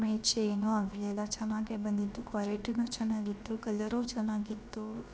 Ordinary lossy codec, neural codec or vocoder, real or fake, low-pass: none; codec, 16 kHz, about 1 kbps, DyCAST, with the encoder's durations; fake; none